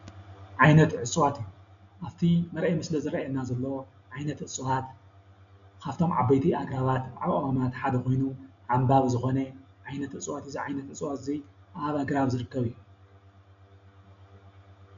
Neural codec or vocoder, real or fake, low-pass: none; real; 7.2 kHz